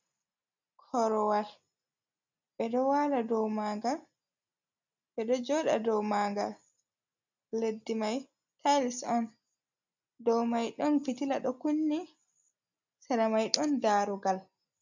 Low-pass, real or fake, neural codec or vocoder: 7.2 kHz; real; none